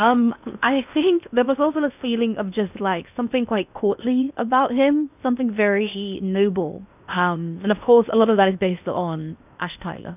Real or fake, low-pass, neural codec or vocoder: fake; 3.6 kHz; codec, 16 kHz in and 24 kHz out, 0.8 kbps, FocalCodec, streaming, 65536 codes